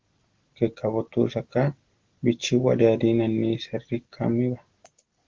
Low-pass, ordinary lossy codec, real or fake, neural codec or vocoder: 7.2 kHz; Opus, 16 kbps; real; none